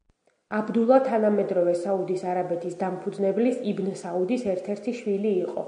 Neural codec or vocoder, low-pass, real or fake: none; 9.9 kHz; real